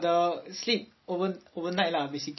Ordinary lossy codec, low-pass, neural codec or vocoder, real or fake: MP3, 24 kbps; 7.2 kHz; none; real